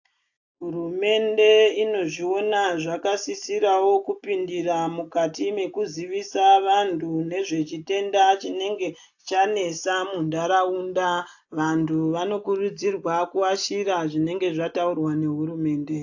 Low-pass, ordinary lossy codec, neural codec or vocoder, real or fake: 7.2 kHz; AAC, 48 kbps; none; real